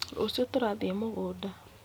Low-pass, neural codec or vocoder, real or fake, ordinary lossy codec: none; vocoder, 44.1 kHz, 128 mel bands every 256 samples, BigVGAN v2; fake; none